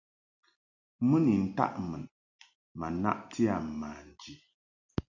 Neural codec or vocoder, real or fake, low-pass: none; real; 7.2 kHz